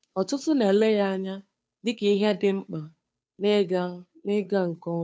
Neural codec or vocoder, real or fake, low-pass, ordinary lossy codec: codec, 16 kHz, 2 kbps, FunCodec, trained on Chinese and English, 25 frames a second; fake; none; none